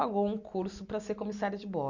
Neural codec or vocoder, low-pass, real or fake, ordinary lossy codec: none; 7.2 kHz; real; none